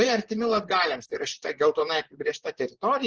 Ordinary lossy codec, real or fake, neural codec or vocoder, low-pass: Opus, 16 kbps; fake; vocoder, 44.1 kHz, 128 mel bands, Pupu-Vocoder; 7.2 kHz